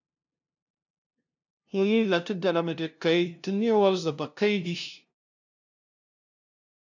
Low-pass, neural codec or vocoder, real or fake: 7.2 kHz; codec, 16 kHz, 0.5 kbps, FunCodec, trained on LibriTTS, 25 frames a second; fake